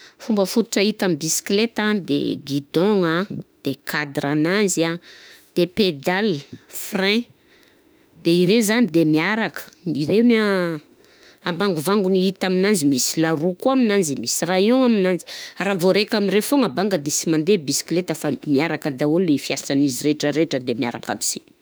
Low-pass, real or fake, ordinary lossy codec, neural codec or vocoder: none; fake; none; autoencoder, 48 kHz, 32 numbers a frame, DAC-VAE, trained on Japanese speech